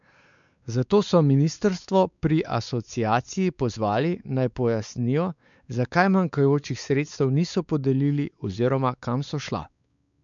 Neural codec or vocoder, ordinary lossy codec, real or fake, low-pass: codec, 16 kHz, 4 kbps, X-Codec, WavLM features, trained on Multilingual LibriSpeech; none; fake; 7.2 kHz